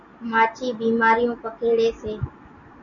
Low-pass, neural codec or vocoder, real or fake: 7.2 kHz; none; real